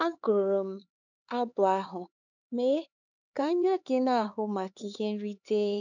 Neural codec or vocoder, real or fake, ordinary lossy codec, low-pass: codec, 16 kHz, 4 kbps, X-Codec, HuBERT features, trained on LibriSpeech; fake; none; 7.2 kHz